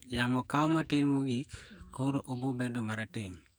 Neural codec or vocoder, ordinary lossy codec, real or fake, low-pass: codec, 44.1 kHz, 2.6 kbps, SNAC; none; fake; none